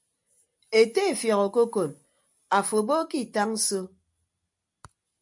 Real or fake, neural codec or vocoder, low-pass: real; none; 10.8 kHz